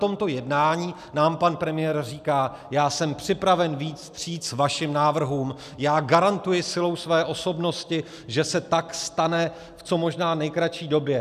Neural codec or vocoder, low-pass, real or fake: none; 14.4 kHz; real